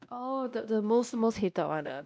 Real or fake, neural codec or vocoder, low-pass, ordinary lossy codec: fake; codec, 16 kHz, 1 kbps, X-Codec, WavLM features, trained on Multilingual LibriSpeech; none; none